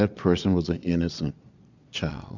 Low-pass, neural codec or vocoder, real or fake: 7.2 kHz; vocoder, 22.05 kHz, 80 mel bands, Vocos; fake